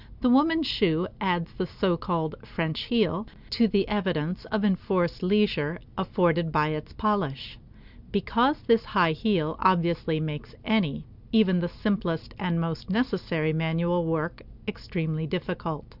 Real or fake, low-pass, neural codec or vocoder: real; 5.4 kHz; none